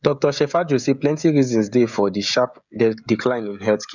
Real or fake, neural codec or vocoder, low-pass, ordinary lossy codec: fake; codec, 16 kHz, 16 kbps, FreqCodec, smaller model; 7.2 kHz; none